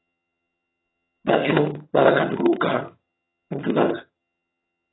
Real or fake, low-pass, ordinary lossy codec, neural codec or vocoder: fake; 7.2 kHz; AAC, 16 kbps; vocoder, 22.05 kHz, 80 mel bands, HiFi-GAN